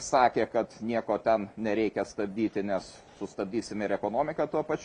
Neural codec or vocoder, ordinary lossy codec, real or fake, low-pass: none; MP3, 64 kbps; real; 10.8 kHz